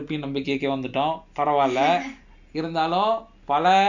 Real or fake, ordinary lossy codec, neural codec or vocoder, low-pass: real; none; none; 7.2 kHz